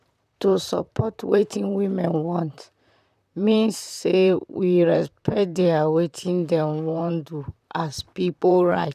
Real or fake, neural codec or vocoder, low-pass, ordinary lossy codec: fake; vocoder, 44.1 kHz, 128 mel bands, Pupu-Vocoder; 14.4 kHz; none